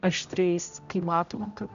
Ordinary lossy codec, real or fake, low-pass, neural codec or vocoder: MP3, 64 kbps; fake; 7.2 kHz; codec, 16 kHz, 0.5 kbps, X-Codec, HuBERT features, trained on general audio